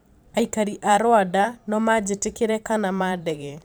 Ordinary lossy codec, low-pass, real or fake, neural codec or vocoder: none; none; fake; vocoder, 44.1 kHz, 128 mel bands every 512 samples, BigVGAN v2